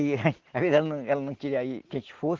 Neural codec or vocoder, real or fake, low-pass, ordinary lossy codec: none; real; 7.2 kHz; Opus, 16 kbps